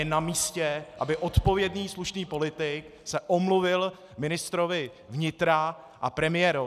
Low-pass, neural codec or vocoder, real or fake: 14.4 kHz; none; real